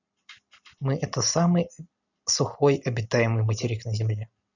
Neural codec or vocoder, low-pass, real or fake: none; 7.2 kHz; real